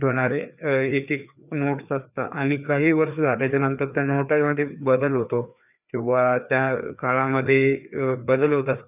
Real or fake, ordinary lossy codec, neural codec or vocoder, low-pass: fake; none; codec, 16 kHz, 2 kbps, FreqCodec, larger model; 3.6 kHz